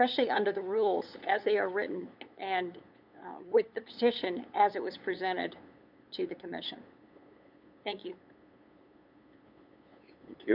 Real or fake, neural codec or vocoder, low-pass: fake; codec, 16 kHz, 8 kbps, FunCodec, trained on LibriTTS, 25 frames a second; 5.4 kHz